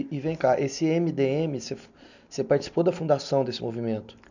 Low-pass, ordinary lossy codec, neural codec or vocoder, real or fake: 7.2 kHz; none; none; real